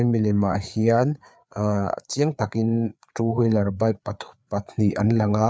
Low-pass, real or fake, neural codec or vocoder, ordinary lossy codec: none; fake; codec, 16 kHz, 4 kbps, FreqCodec, larger model; none